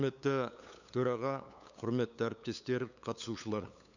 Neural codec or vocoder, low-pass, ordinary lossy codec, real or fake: codec, 16 kHz, 8 kbps, FunCodec, trained on LibriTTS, 25 frames a second; 7.2 kHz; none; fake